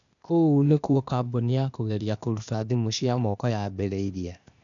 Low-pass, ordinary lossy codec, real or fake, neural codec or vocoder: 7.2 kHz; none; fake; codec, 16 kHz, 0.8 kbps, ZipCodec